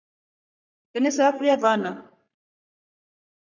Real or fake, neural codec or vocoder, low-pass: fake; codec, 44.1 kHz, 3.4 kbps, Pupu-Codec; 7.2 kHz